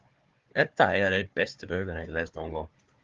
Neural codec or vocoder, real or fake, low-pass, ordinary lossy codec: codec, 16 kHz, 4 kbps, FunCodec, trained on Chinese and English, 50 frames a second; fake; 7.2 kHz; Opus, 32 kbps